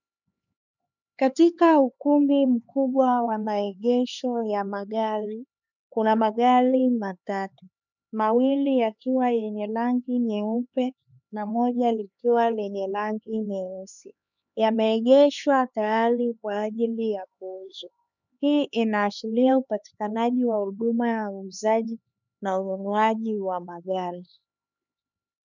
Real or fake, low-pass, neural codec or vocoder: fake; 7.2 kHz; codec, 16 kHz, 4 kbps, X-Codec, HuBERT features, trained on LibriSpeech